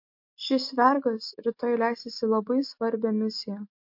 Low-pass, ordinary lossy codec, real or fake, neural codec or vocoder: 5.4 kHz; MP3, 32 kbps; real; none